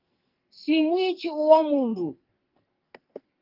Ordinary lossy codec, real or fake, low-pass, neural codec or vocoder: Opus, 32 kbps; fake; 5.4 kHz; codec, 44.1 kHz, 2.6 kbps, SNAC